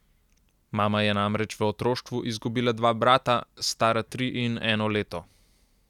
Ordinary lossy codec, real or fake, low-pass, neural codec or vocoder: none; real; 19.8 kHz; none